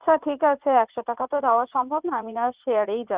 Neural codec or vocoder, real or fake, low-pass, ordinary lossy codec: vocoder, 44.1 kHz, 80 mel bands, Vocos; fake; 3.6 kHz; Opus, 64 kbps